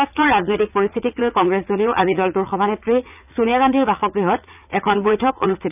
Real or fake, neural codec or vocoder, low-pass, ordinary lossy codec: fake; vocoder, 44.1 kHz, 80 mel bands, Vocos; 3.6 kHz; none